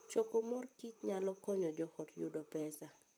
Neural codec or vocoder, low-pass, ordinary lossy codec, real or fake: vocoder, 44.1 kHz, 128 mel bands, Pupu-Vocoder; none; none; fake